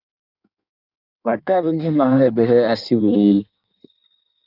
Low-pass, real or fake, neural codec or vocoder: 5.4 kHz; fake; codec, 16 kHz in and 24 kHz out, 1.1 kbps, FireRedTTS-2 codec